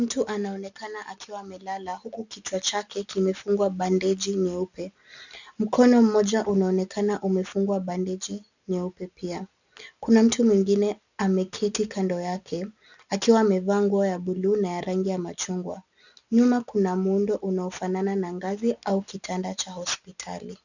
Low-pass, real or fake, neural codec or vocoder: 7.2 kHz; real; none